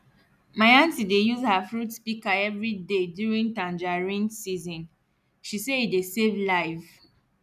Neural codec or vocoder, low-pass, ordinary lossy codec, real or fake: none; 14.4 kHz; none; real